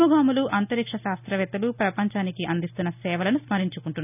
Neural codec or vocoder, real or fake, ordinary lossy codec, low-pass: none; real; none; 3.6 kHz